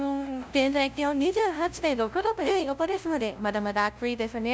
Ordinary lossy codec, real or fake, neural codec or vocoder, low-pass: none; fake; codec, 16 kHz, 0.5 kbps, FunCodec, trained on LibriTTS, 25 frames a second; none